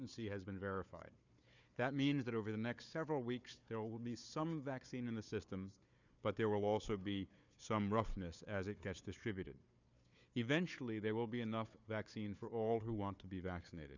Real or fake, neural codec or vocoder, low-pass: fake; codec, 16 kHz, 4 kbps, FunCodec, trained on Chinese and English, 50 frames a second; 7.2 kHz